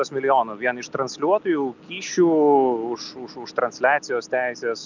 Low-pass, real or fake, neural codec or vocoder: 7.2 kHz; real; none